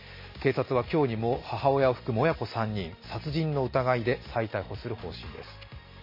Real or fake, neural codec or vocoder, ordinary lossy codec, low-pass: real; none; MP3, 24 kbps; 5.4 kHz